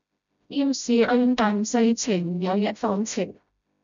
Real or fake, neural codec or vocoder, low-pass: fake; codec, 16 kHz, 0.5 kbps, FreqCodec, smaller model; 7.2 kHz